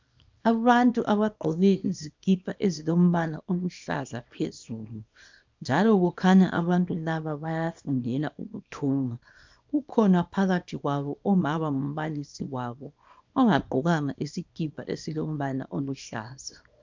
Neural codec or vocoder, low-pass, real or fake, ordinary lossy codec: codec, 24 kHz, 0.9 kbps, WavTokenizer, small release; 7.2 kHz; fake; MP3, 64 kbps